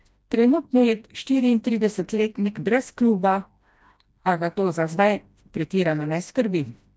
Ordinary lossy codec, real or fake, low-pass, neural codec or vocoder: none; fake; none; codec, 16 kHz, 1 kbps, FreqCodec, smaller model